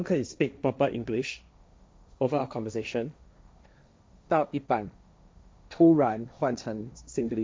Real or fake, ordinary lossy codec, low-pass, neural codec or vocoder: fake; none; none; codec, 16 kHz, 1.1 kbps, Voila-Tokenizer